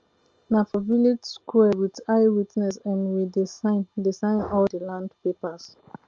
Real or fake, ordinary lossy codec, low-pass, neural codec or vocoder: real; Opus, 24 kbps; 7.2 kHz; none